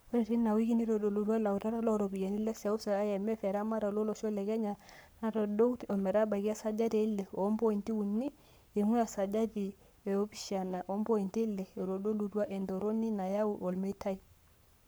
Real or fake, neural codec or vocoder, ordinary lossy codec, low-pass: fake; codec, 44.1 kHz, 7.8 kbps, Pupu-Codec; none; none